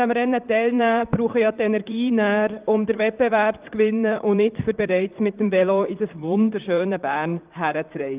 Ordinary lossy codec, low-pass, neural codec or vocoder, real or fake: Opus, 32 kbps; 3.6 kHz; vocoder, 44.1 kHz, 128 mel bands, Pupu-Vocoder; fake